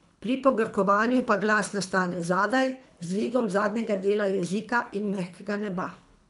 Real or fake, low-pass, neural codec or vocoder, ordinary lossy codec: fake; 10.8 kHz; codec, 24 kHz, 3 kbps, HILCodec; none